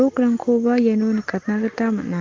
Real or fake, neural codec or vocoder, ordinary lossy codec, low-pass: real; none; Opus, 16 kbps; 7.2 kHz